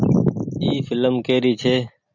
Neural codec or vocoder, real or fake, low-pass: none; real; 7.2 kHz